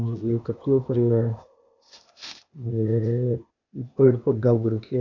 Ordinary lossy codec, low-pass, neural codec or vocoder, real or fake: none; 7.2 kHz; codec, 16 kHz, 0.8 kbps, ZipCodec; fake